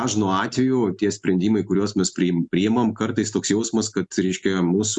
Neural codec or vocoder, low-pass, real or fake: none; 10.8 kHz; real